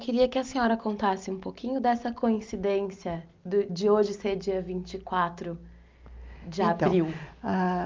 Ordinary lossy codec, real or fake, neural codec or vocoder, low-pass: Opus, 24 kbps; real; none; 7.2 kHz